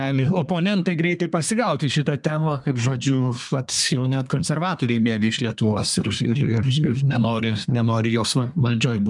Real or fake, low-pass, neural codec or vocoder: fake; 10.8 kHz; codec, 24 kHz, 1 kbps, SNAC